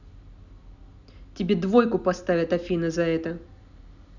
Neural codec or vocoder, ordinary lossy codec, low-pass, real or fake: none; none; 7.2 kHz; real